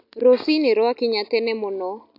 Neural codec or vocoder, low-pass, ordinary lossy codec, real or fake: none; 5.4 kHz; MP3, 48 kbps; real